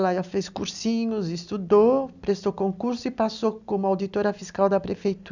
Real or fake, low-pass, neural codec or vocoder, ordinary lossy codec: real; 7.2 kHz; none; none